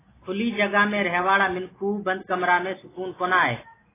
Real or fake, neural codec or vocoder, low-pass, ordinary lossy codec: real; none; 3.6 kHz; AAC, 16 kbps